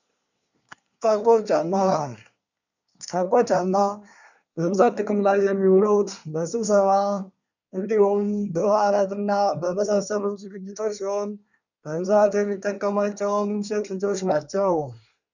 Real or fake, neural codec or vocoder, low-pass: fake; codec, 24 kHz, 1 kbps, SNAC; 7.2 kHz